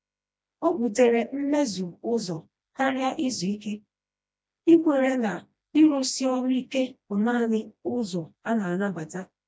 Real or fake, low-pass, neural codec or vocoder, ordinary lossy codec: fake; none; codec, 16 kHz, 1 kbps, FreqCodec, smaller model; none